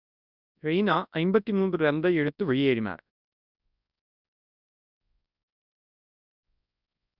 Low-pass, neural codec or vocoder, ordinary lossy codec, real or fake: 5.4 kHz; codec, 24 kHz, 0.9 kbps, WavTokenizer, large speech release; none; fake